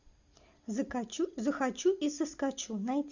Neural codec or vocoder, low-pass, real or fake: none; 7.2 kHz; real